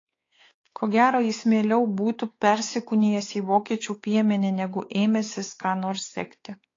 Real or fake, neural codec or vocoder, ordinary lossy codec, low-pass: fake; codec, 16 kHz, 4 kbps, X-Codec, WavLM features, trained on Multilingual LibriSpeech; AAC, 32 kbps; 7.2 kHz